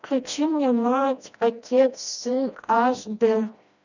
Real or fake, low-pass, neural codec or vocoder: fake; 7.2 kHz; codec, 16 kHz, 1 kbps, FreqCodec, smaller model